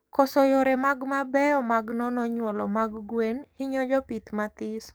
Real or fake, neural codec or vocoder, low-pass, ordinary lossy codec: fake; codec, 44.1 kHz, 7.8 kbps, DAC; none; none